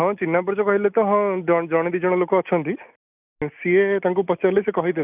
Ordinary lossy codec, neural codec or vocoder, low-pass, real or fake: none; none; 3.6 kHz; real